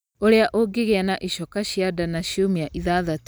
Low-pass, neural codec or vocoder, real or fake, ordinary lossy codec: none; none; real; none